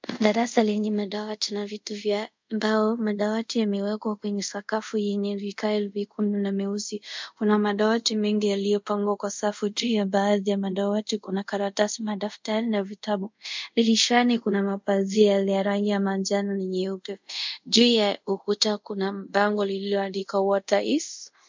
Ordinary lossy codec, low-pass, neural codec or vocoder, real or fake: MP3, 64 kbps; 7.2 kHz; codec, 24 kHz, 0.5 kbps, DualCodec; fake